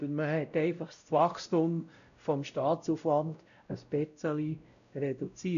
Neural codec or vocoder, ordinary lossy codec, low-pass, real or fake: codec, 16 kHz, 0.5 kbps, X-Codec, WavLM features, trained on Multilingual LibriSpeech; none; 7.2 kHz; fake